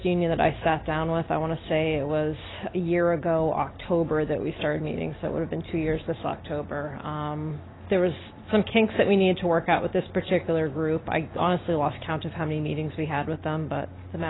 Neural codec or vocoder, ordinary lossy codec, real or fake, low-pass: none; AAC, 16 kbps; real; 7.2 kHz